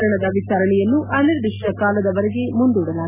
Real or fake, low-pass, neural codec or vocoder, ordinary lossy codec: real; 3.6 kHz; none; none